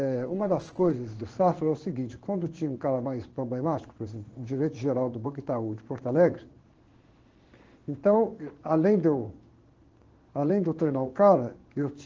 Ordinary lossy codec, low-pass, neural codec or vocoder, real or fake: Opus, 16 kbps; 7.2 kHz; codec, 16 kHz in and 24 kHz out, 1 kbps, XY-Tokenizer; fake